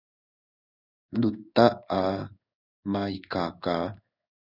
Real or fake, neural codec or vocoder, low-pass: fake; codec, 16 kHz in and 24 kHz out, 1 kbps, XY-Tokenizer; 5.4 kHz